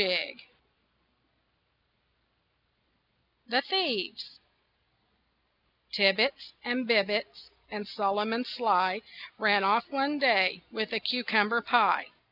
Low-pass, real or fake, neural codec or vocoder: 5.4 kHz; real; none